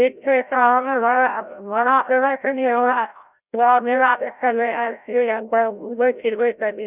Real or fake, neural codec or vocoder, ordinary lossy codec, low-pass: fake; codec, 16 kHz, 0.5 kbps, FreqCodec, larger model; none; 3.6 kHz